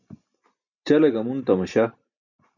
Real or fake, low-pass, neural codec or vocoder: real; 7.2 kHz; none